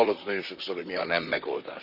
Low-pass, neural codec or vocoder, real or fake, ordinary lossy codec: 5.4 kHz; vocoder, 44.1 kHz, 128 mel bands, Pupu-Vocoder; fake; none